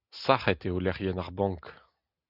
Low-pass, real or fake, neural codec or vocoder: 5.4 kHz; real; none